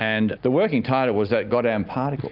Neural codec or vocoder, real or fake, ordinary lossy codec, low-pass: none; real; Opus, 32 kbps; 5.4 kHz